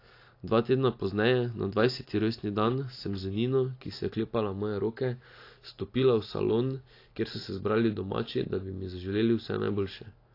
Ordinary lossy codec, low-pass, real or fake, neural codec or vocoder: AAC, 32 kbps; 5.4 kHz; real; none